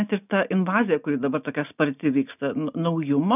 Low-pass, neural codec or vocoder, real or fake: 3.6 kHz; none; real